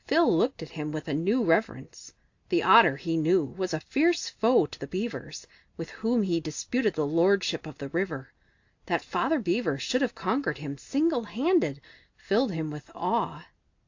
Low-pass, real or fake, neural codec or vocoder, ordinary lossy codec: 7.2 kHz; real; none; AAC, 48 kbps